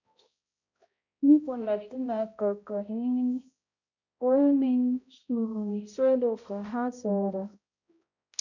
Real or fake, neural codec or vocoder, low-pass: fake; codec, 16 kHz, 0.5 kbps, X-Codec, HuBERT features, trained on balanced general audio; 7.2 kHz